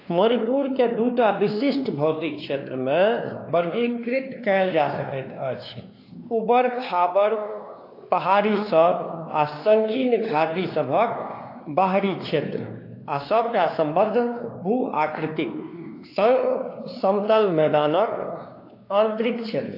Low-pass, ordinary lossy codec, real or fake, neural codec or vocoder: 5.4 kHz; AAC, 32 kbps; fake; codec, 16 kHz, 2 kbps, X-Codec, WavLM features, trained on Multilingual LibriSpeech